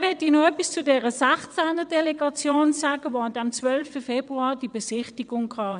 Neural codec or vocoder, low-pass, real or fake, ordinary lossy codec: vocoder, 22.05 kHz, 80 mel bands, WaveNeXt; 9.9 kHz; fake; none